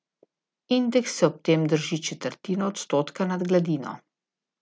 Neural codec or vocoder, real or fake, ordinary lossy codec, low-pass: none; real; none; none